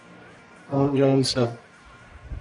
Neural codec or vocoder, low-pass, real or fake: codec, 44.1 kHz, 1.7 kbps, Pupu-Codec; 10.8 kHz; fake